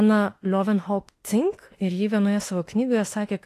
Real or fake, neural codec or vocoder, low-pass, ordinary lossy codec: fake; autoencoder, 48 kHz, 32 numbers a frame, DAC-VAE, trained on Japanese speech; 14.4 kHz; AAC, 48 kbps